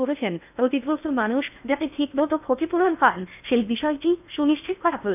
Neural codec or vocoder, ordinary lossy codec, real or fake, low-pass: codec, 16 kHz in and 24 kHz out, 0.6 kbps, FocalCodec, streaming, 2048 codes; none; fake; 3.6 kHz